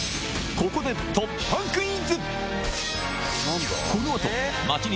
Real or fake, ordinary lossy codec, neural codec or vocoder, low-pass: real; none; none; none